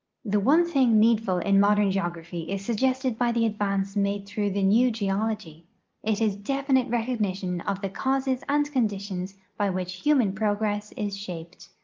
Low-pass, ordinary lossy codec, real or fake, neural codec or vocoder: 7.2 kHz; Opus, 24 kbps; real; none